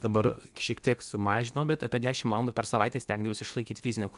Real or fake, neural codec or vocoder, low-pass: fake; codec, 16 kHz in and 24 kHz out, 0.8 kbps, FocalCodec, streaming, 65536 codes; 10.8 kHz